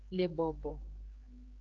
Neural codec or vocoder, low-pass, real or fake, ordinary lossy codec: codec, 16 kHz, 4 kbps, X-Codec, HuBERT features, trained on general audio; 7.2 kHz; fake; Opus, 32 kbps